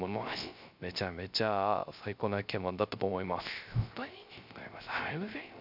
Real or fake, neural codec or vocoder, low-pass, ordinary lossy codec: fake; codec, 16 kHz, 0.3 kbps, FocalCodec; 5.4 kHz; none